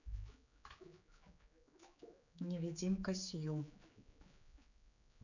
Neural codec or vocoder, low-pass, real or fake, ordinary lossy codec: codec, 16 kHz, 4 kbps, X-Codec, HuBERT features, trained on general audio; 7.2 kHz; fake; none